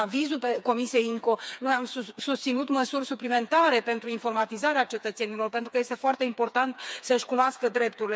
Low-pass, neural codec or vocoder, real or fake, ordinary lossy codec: none; codec, 16 kHz, 4 kbps, FreqCodec, smaller model; fake; none